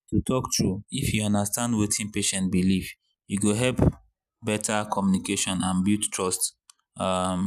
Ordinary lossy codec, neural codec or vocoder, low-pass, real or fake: none; none; 14.4 kHz; real